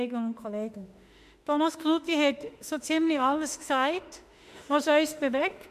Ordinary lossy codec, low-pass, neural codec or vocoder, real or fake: none; 14.4 kHz; autoencoder, 48 kHz, 32 numbers a frame, DAC-VAE, trained on Japanese speech; fake